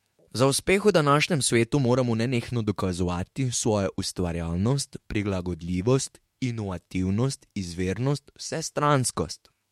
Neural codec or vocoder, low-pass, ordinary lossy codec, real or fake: autoencoder, 48 kHz, 128 numbers a frame, DAC-VAE, trained on Japanese speech; 19.8 kHz; MP3, 64 kbps; fake